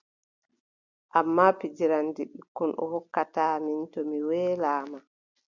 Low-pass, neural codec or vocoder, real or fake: 7.2 kHz; none; real